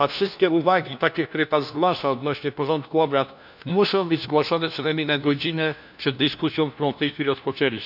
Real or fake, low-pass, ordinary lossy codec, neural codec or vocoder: fake; 5.4 kHz; none; codec, 16 kHz, 1 kbps, FunCodec, trained on LibriTTS, 50 frames a second